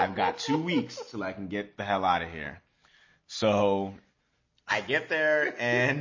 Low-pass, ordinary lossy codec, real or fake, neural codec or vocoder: 7.2 kHz; MP3, 32 kbps; real; none